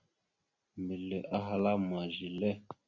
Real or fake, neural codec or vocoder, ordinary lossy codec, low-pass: real; none; Opus, 64 kbps; 7.2 kHz